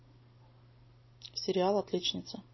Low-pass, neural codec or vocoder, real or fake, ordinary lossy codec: 7.2 kHz; none; real; MP3, 24 kbps